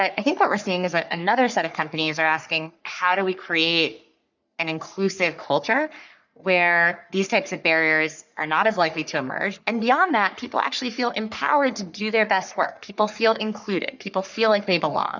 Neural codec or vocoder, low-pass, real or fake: codec, 44.1 kHz, 3.4 kbps, Pupu-Codec; 7.2 kHz; fake